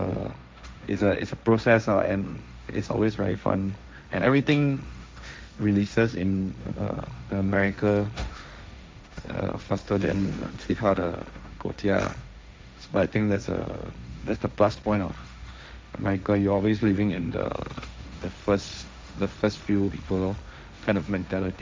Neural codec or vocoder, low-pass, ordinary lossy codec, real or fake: codec, 16 kHz, 1.1 kbps, Voila-Tokenizer; none; none; fake